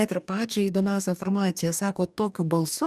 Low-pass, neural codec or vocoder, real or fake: 14.4 kHz; codec, 44.1 kHz, 2.6 kbps, DAC; fake